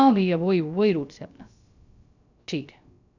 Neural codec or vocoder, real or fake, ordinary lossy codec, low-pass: codec, 16 kHz, about 1 kbps, DyCAST, with the encoder's durations; fake; none; 7.2 kHz